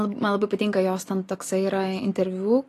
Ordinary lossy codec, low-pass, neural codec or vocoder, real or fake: AAC, 48 kbps; 14.4 kHz; none; real